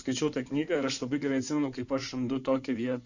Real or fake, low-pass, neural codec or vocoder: fake; 7.2 kHz; codec, 16 kHz in and 24 kHz out, 2.2 kbps, FireRedTTS-2 codec